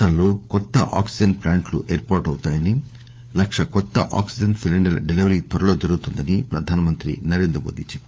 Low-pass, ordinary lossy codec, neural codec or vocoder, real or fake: none; none; codec, 16 kHz, 4 kbps, FunCodec, trained on LibriTTS, 50 frames a second; fake